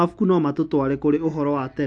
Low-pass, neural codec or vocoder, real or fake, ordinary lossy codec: 9.9 kHz; none; real; AAC, 64 kbps